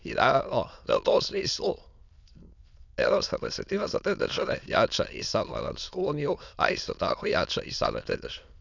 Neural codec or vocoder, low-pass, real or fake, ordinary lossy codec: autoencoder, 22.05 kHz, a latent of 192 numbers a frame, VITS, trained on many speakers; 7.2 kHz; fake; none